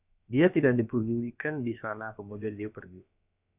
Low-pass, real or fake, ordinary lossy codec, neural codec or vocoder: 3.6 kHz; fake; AAC, 32 kbps; codec, 16 kHz, about 1 kbps, DyCAST, with the encoder's durations